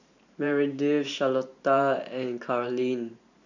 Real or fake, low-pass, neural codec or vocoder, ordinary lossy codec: fake; 7.2 kHz; vocoder, 44.1 kHz, 128 mel bands every 512 samples, BigVGAN v2; none